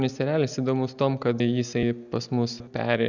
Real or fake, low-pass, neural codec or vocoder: real; 7.2 kHz; none